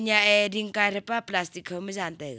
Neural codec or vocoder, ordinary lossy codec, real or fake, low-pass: none; none; real; none